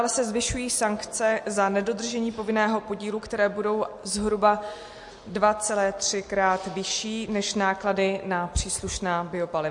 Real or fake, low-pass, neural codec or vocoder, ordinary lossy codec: real; 10.8 kHz; none; MP3, 48 kbps